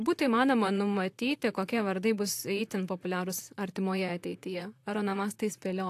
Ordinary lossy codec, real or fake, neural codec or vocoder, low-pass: AAC, 64 kbps; fake; vocoder, 44.1 kHz, 128 mel bands, Pupu-Vocoder; 14.4 kHz